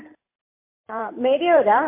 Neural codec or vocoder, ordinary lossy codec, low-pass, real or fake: vocoder, 44.1 kHz, 128 mel bands every 512 samples, BigVGAN v2; MP3, 24 kbps; 3.6 kHz; fake